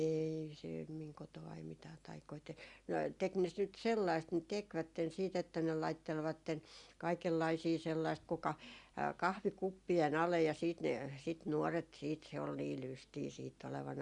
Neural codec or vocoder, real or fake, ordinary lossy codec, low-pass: none; real; Opus, 64 kbps; 10.8 kHz